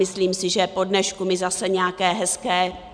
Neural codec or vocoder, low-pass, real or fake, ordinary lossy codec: none; 9.9 kHz; real; MP3, 96 kbps